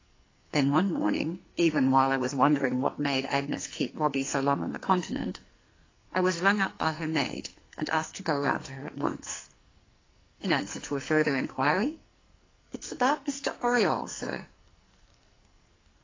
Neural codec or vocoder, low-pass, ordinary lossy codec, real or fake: codec, 44.1 kHz, 2.6 kbps, SNAC; 7.2 kHz; AAC, 32 kbps; fake